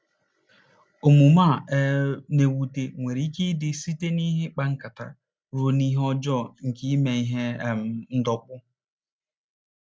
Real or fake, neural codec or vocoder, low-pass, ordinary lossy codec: real; none; none; none